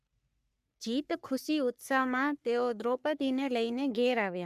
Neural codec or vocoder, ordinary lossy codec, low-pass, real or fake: codec, 44.1 kHz, 3.4 kbps, Pupu-Codec; none; 14.4 kHz; fake